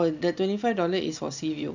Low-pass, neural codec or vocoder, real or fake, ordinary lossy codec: 7.2 kHz; none; real; none